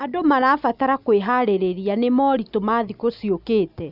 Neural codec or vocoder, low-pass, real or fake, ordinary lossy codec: none; 5.4 kHz; real; none